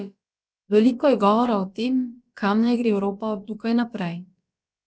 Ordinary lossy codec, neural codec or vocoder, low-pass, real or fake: none; codec, 16 kHz, about 1 kbps, DyCAST, with the encoder's durations; none; fake